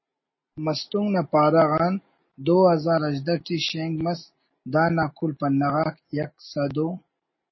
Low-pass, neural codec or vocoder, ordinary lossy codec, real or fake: 7.2 kHz; none; MP3, 24 kbps; real